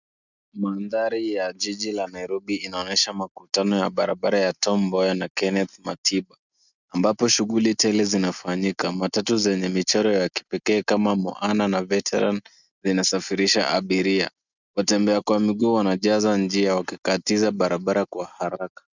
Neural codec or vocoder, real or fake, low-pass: none; real; 7.2 kHz